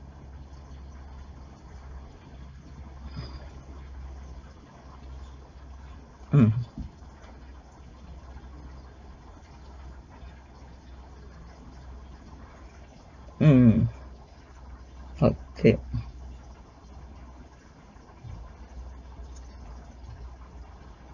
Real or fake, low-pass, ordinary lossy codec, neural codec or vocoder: real; 7.2 kHz; none; none